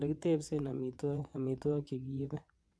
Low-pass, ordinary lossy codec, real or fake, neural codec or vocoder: none; none; fake; vocoder, 22.05 kHz, 80 mel bands, WaveNeXt